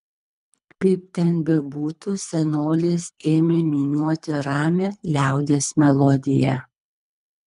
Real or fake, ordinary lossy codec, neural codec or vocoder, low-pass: fake; MP3, 96 kbps; codec, 24 kHz, 3 kbps, HILCodec; 10.8 kHz